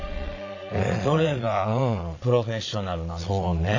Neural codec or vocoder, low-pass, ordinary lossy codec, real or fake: vocoder, 22.05 kHz, 80 mel bands, Vocos; 7.2 kHz; AAC, 48 kbps; fake